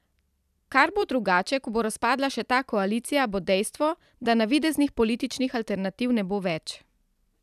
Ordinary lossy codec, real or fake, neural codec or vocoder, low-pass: none; fake; vocoder, 44.1 kHz, 128 mel bands every 256 samples, BigVGAN v2; 14.4 kHz